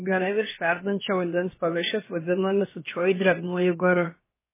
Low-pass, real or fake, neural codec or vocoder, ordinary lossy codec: 3.6 kHz; fake; codec, 16 kHz, about 1 kbps, DyCAST, with the encoder's durations; MP3, 16 kbps